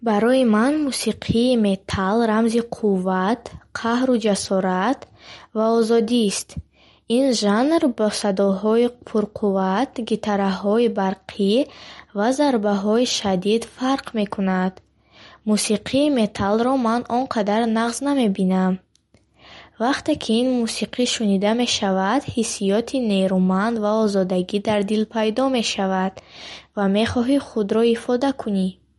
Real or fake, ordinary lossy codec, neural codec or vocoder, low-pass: real; MP3, 48 kbps; none; 19.8 kHz